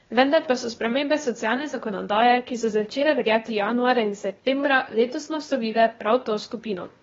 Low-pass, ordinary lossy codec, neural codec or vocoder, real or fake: 7.2 kHz; AAC, 24 kbps; codec, 16 kHz, 0.8 kbps, ZipCodec; fake